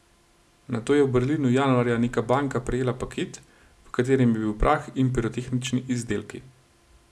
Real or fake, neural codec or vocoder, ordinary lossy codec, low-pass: real; none; none; none